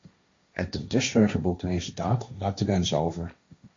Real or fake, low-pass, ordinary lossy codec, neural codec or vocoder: fake; 7.2 kHz; MP3, 48 kbps; codec, 16 kHz, 1.1 kbps, Voila-Tokenizer